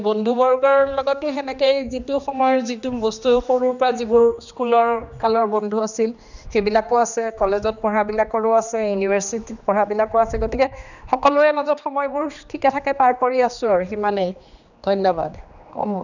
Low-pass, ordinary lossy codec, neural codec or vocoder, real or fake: 7.2 kHz; none; codec, 16 kHz, 2 kbps, X-Codec, HuBERT features, trained on general audio; fake